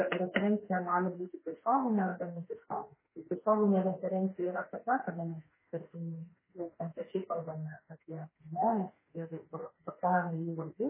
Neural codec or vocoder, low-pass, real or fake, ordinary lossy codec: autoencoder, 48 kHz, 32 numbers a frame, DAC-VAE, trained on Japanese speech; 3.6 kHz; fake; MP3, 16 kbps